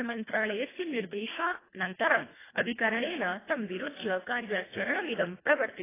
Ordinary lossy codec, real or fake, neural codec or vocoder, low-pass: AAC, 16 kbps; fake; codec, 24 kHz, 1.5 kbps, HILCodec; 3.6 kHz